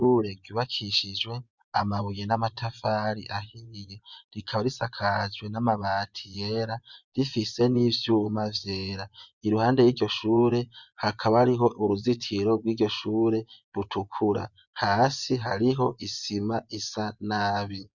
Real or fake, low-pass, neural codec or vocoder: fake; 7.2 kHz; vocoder, 24 kHz, 100 mel bands, Vocos